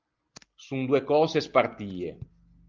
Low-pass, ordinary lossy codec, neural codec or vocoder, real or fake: 7.2 kHz; Opus, 24 kbps; none; real